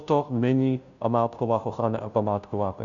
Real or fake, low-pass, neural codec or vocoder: fake; 7.2 kHz; codec, 16 kHz, 0.5 kbps, FunCodec, trained on Chinese and English, 25 frames a second